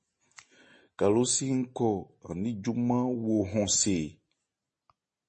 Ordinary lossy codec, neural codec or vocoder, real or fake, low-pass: MP3, 32 kbps; none; real; 10.8 kHz